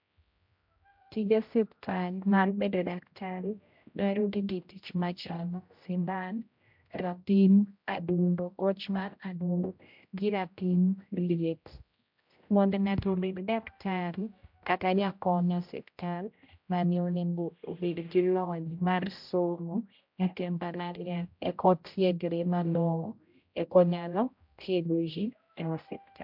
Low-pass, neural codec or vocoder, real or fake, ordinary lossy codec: 5.4 kHz; codec, 16 kHz, 0.5 kbps, X-Codec, HuBERT features, trained on general audio; fake; MP3, 48 kbps